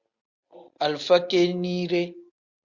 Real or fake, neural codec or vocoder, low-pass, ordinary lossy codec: real; none; 7.2 kHz; Opus, 64 kbps